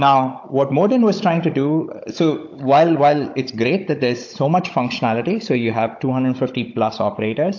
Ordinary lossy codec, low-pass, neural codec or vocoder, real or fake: AAC, 48 kbps; 7.2 kHz; codec, 16 kHz, 16 kbps, FunCodec, trained on Chinese and English, 50 frames a second; fake